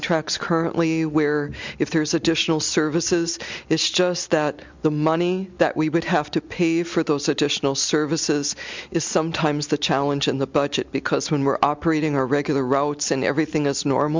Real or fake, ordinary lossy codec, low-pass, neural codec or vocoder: real; MP3, 64 kbps; 7.2 kHz; none